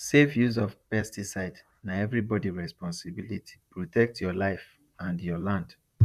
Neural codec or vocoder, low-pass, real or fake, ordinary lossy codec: vocoder, 44.1 kHz, 128 mel bands, Pupu-Vocoder; 14.4 kHz; fake; none